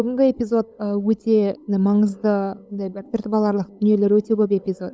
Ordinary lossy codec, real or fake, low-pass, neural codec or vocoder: none; fake; none; codec, 16 kHz, 8 kbps, FunCodec, trained on LibriTTS, 25 frames a second